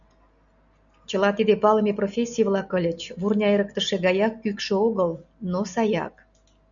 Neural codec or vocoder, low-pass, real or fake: none; 7.2 kHz; real